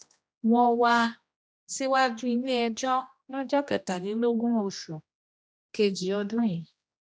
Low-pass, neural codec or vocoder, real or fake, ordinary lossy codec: none; codec, 16 kHz, 1 kbps, X-Codec, HuBERT features, trained on general audio; fake; none